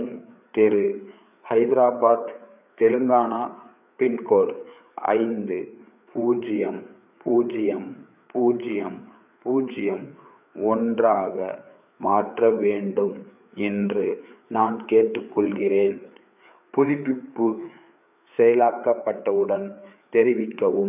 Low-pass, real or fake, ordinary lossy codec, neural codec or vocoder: 3.6 kHz; fake; none; codec, 16 kHz, 4 kbps, FreqCodec, larger model